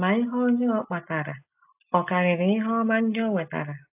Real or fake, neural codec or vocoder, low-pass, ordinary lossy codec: real; none; 3.6 kHz; AAC, 32 kbps